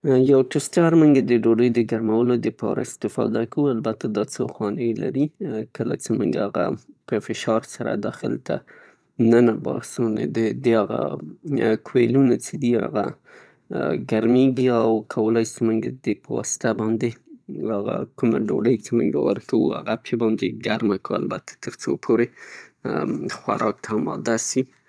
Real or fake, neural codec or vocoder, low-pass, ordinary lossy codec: fake; vocoder, 22.05 kHz, 80 mel bands, Vocos; none; none